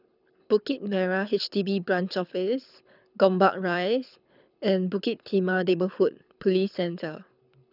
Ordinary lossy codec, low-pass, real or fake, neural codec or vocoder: none; 5.4 kHz; fake; codec, 24 kHz, 6 kbps, HILCodec